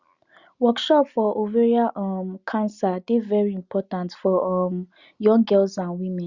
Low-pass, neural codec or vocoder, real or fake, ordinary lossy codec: 7.2 kHz; none; real; Opus, 64 kbps